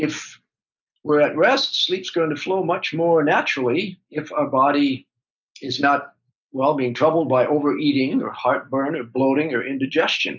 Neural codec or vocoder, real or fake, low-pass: none; real; 7.2 kHz